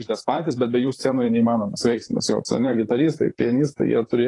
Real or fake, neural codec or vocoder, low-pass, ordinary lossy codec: fake; vocoder, 44.1 kHz, 128 mel bands, Pupu-Vocoder; 10.8 kHz; AAC, 32 kbps